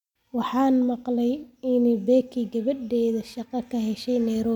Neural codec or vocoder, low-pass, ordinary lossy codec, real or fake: none; 19.8 kHz; none; real